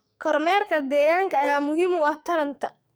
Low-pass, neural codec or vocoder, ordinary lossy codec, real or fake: none; codec, 44.1 kHz, 2.6 kbps, SNAC; none; fake